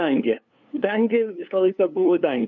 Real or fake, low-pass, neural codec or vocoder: fake; 7.2 kHz; codec, 16 kHz, 2 kbps, FunCodec, trained on LibriTTS, 25 frames a second